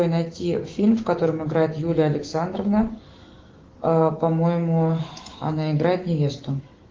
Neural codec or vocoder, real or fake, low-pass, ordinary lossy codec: autoencoder, 48 kHz, 128 numbers a frame, DAC-VAE, trained on Japanese speech; fake; 7.2 kHz; Opus, 16 kbps